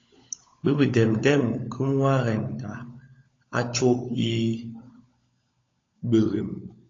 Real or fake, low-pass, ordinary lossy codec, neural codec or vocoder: fake; 7.2 kHz; AAC, 32 kbps; codec, 16 kHz, 16 kbps, FunCodec, trained on LibriTTS, 50 frames a second